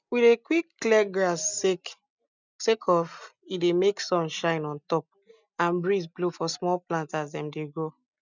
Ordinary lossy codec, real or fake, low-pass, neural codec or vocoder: none; real; 7.2 kHz; none